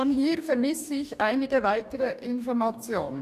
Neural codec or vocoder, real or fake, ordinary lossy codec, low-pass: codec, 44.1 kHz, 2.6 kbps, DAC; fake; none; 14.4 kHz